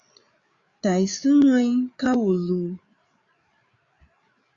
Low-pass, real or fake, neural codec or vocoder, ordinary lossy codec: 7.2 kHz; fake; codec, 16 kHz, 8 kbps, FreqCodec, larger model; Opus, 64 kbps